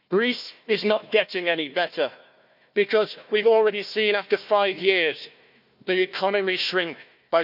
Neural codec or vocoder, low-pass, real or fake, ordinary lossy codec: codec, 16 kHz, 1 kbps, FunCodec, trained on Chinese and English, 50 frames a second; 5.4 kHz; fake; none